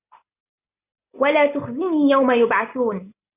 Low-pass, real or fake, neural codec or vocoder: 3.6 kHz; real; none